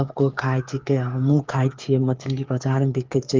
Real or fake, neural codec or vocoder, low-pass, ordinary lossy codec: fake; codec, 16 kHz, 8 kbps, FreqCodec, smaller model; 7.2 kHz; Opus, 32 kbps